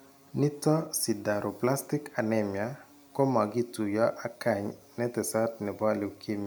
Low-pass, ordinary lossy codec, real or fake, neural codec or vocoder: none; none; real; none